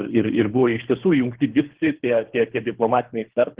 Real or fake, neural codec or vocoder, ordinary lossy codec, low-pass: fake; codec, 24 kHz, 3 kbps, HILCodec; Opus, 16 kbps; 3.6 kHz